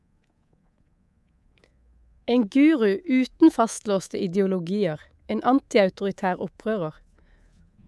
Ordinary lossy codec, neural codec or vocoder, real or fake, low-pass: none; codec, 24 kHz, 3.1 kbps, DualCodec; fake; none